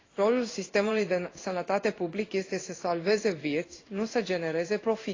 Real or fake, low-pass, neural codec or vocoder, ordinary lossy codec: fake; 7.2 kHz; codec, 16 kHz in and 24 kHz out, 1 kbps, XY-Tokenizer; AAC, 32 kbps